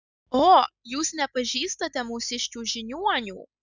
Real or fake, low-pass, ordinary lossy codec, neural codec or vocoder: real; 7.2 kHz; Opus, 64 kbps; none